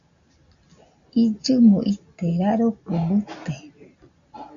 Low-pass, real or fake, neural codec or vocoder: 7.2 kHz; real; none